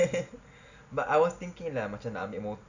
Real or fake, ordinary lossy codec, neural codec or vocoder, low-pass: real; none; none; 7.2 kHz